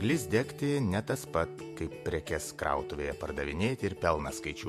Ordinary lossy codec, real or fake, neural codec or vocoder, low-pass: MP3, 64 kbps; fake; vocoder, 48 kHz, 128 mel bands, Vocos; 14.4 kHz